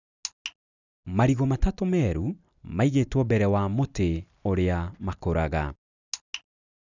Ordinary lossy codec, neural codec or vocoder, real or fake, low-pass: none; none; real; 7.2 kHz